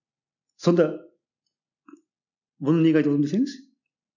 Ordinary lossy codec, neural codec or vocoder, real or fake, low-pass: none; none; real; 7.2 kHz